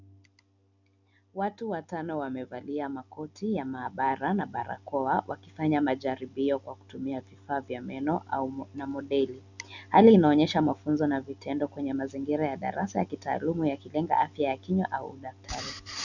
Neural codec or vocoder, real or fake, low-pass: none; real; 7.2 kHz